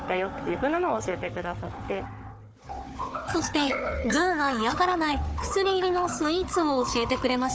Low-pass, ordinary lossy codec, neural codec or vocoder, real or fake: none; none; codec, 16 kHz, 4 kbps, FunCodec, trained on Chinese and English, 50 frames a second; fake